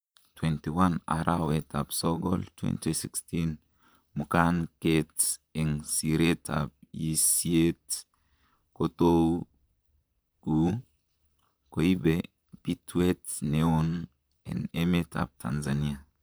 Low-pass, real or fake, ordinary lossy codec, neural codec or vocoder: none; fake; none; vocoder, 44.1 kHz, 128 mel bands, Pupu-Vocoder